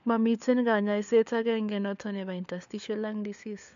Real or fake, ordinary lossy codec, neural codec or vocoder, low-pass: fake; MP3, 64 kbps; codec, 16 kHz, 4 kbps, FunCodec, trained on LibriTTS, 50 frames a second; 7.2 kHz